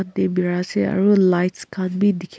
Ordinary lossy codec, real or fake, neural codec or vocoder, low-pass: none; real; none; none